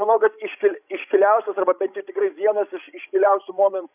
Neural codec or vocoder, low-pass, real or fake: codec, 16 kHz, 16 kbps, FreqCodec, larger model; 3.6 kHz; fake